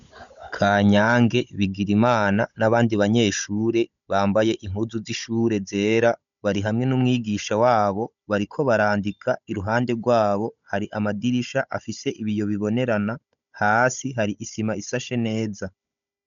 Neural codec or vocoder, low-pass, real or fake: codec, 16 kHz, 16 kbps, FunCodec, trained on Chinese and English, 50 frames a second; 7.2 kHz; fake